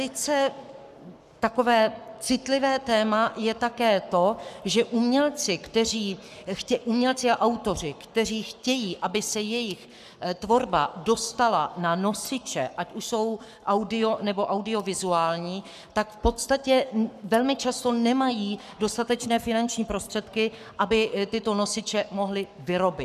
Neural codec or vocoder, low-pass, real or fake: codec, 44.1 kHz, 7.8 kbps, Pupu-Codec; 14.4 kHz; fake